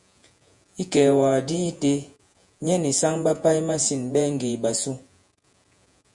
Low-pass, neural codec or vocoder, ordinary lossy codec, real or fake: 10.8 kHz; vocoder, 48 kHz, 128 mel bands, Vocos; MP3, 96 kbps; fake